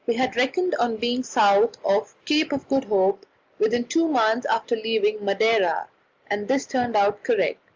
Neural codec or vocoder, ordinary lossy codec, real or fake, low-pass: none; Opus, 32 kbps; real; 7.2 kHz